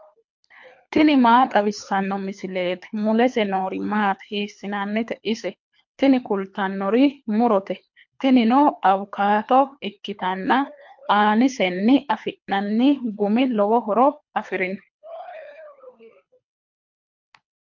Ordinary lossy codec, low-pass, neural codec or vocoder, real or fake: MP3, 48 kbps; 7.2 kHz; codec, 24 kHz, 3 kbps, HILCodec; fake